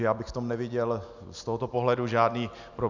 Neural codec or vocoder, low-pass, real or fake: none; 7.2 kHz; real